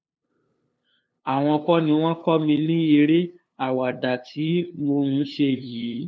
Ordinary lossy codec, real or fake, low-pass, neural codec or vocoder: none; fake; none; codec, 16 kHz, 2 kbps, FunCodec, trained on LibriTTS, 25 frames a second